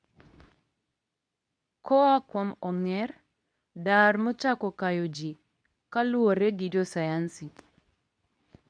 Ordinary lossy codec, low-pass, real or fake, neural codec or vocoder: none; 9.9 kHz; fake; codec, 24 kHz, 0.9 kbps, WavTokenizer, medium speech release version 2